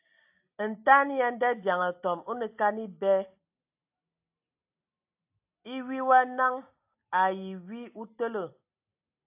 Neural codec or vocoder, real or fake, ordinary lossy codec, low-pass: none; real; AAC, 32 kbps; 3.6 kHz